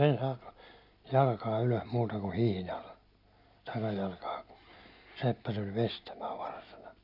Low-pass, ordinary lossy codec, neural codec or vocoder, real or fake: 5.4 kHz; none; none; real